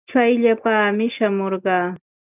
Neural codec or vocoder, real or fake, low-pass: none; real; 3.6 kHz